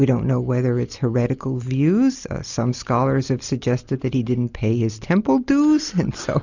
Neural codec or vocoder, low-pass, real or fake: none; 7.2 kHz; real